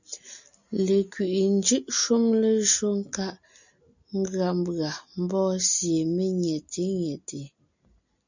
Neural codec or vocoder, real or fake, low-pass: none; real; 7.2 kHz